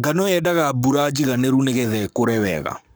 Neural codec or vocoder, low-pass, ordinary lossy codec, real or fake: codec, 44.1 kHz, 7.8 kbps, Pupu-Codec; none; none; fake